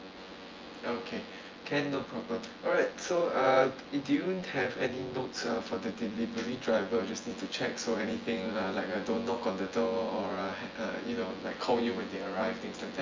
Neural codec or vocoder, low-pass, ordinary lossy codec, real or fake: vocoder, 24 kHz, 100 mel bands, Vocos; 7.2 kHz; Opus, 32 kbps; fake